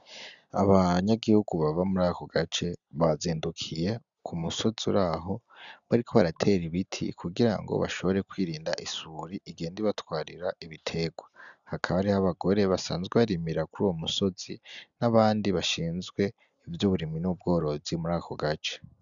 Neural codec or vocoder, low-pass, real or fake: none; 7.2 kHz; real